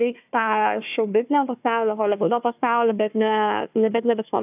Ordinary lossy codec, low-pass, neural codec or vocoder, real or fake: AAC, 32 kbps; 3.6 kHz; codec, 16 kHz, 1 kbps, FunCodec, trained on Chinese and English, 50 frames a second; fake